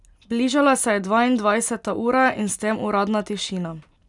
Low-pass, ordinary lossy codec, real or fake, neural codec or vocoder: 10.8 kHz; none; real; none